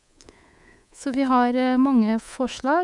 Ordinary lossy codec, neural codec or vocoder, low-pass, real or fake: MP3, 96 kbps; codec, 24 kHz, 3.1 kbps, DualCodec; 10.8 kHz; fake